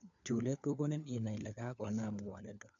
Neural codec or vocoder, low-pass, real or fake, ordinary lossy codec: codec, 16 kHz, 4 kbps, FunCodec, trained on LibriTTS, 50 frames a second; 7.2 kHz; fake; none